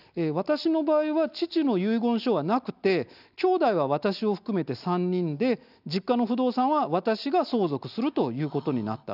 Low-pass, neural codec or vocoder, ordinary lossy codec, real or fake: 5.4 kHz; none; none; real